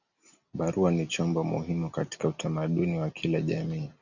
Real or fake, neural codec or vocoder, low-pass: real; none; 7.2 kHz